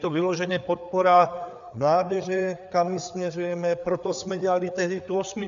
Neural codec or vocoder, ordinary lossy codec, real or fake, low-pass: codec, 16 kHz, 4 kbps, FreqCodec, larger model; MP3, 96 kbps; fake; 7.2 kHz